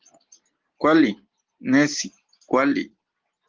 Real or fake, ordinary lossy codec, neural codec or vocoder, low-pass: real; Opus, 16 kbps; none; 7.2 kHz